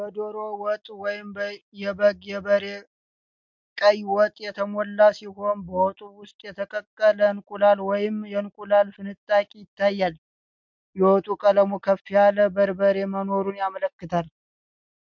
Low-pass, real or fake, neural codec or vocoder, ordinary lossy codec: 7.2 kHz; real; none; MP3, 64 kbps